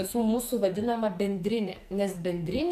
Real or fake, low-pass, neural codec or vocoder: fake; 14.4 kHz; codec, 44.1 kHz, 2.6 kbps, SNAC